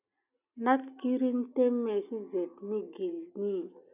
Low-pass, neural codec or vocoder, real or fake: 3.6 kHz; none; real